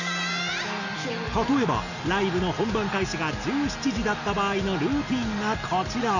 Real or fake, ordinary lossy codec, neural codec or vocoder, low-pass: real; none; none; 7.2 kHz